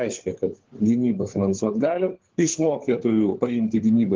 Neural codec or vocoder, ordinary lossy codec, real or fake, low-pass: codec, 16 kHz, 4 kbps, FunCodec, trained on LibriTTS, 50 frames a second; Opus, 16 kbps; fake; 7.2 kHz